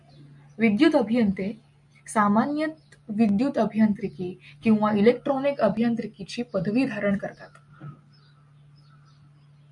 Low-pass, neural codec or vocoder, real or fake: 10.8 kHz; none; real